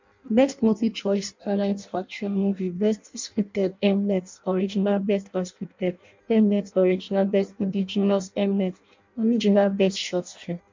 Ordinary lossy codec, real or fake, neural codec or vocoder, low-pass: MP3, 64 kbps; fake; codec, 16 kHz in and 24 kHz out, 0.6 kbps, FireRedTTS-2 codec; 7.2 kHz